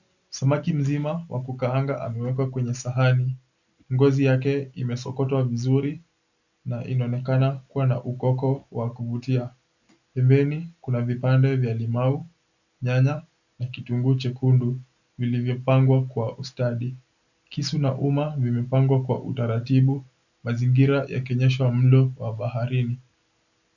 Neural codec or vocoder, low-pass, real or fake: none; 7.2 kHz; real